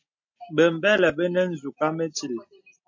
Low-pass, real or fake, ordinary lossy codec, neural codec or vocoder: 7.2 kHz; real; MP3, 48 kbps; none